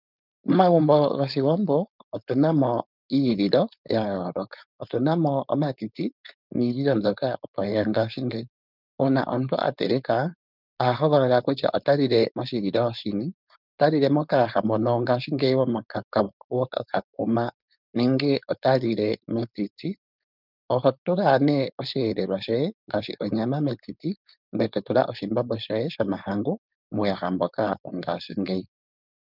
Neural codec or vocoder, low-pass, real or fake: codec, 16 kHz, 4.8 kbps, FACodec; 5.4 kHz; fake